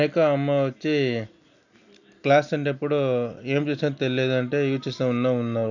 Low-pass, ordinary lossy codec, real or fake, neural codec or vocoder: 7.2 kHz; none; real; none